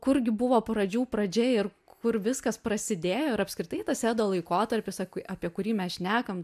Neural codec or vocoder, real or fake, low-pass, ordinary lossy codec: none; real; 14.4 kHz; MP3, 96 kbps